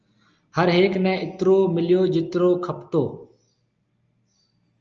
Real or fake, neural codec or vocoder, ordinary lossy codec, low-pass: real; none; Opus, 24 kbps; 7.2 kHz